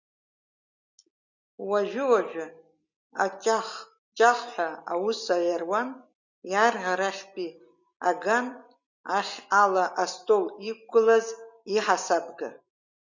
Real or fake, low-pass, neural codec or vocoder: real; 7.2 kHz; none